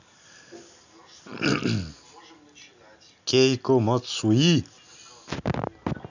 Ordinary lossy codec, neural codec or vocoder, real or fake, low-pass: none; none; real; 7.2 kHz